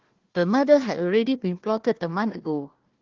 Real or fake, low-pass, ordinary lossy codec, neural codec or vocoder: fake; 7.2 kHz; Opus, 16 kbps; codec, 16 kHz, 1 kbps, FunCodec, trained on Chinese and English, 50 frames a second